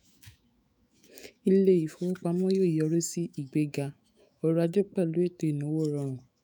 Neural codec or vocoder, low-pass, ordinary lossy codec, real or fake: autoencoder, 48 kHz, 128 numbers a frame, DAC-VAE, trained on Japanese speech; none; none; fake